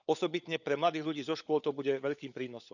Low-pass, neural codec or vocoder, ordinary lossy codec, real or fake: 7.2 kHz; codec, 16 kHz, 16 kbps, FunCodec, trained on LibriTTS, 50 frames a second; none; fake